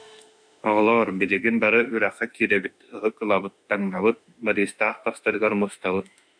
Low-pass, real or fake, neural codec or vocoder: 9.9 kHz; fake; autoencoder, 48 kHz, 32 numbers a frame, DAC-VAE, trained on Japanese speech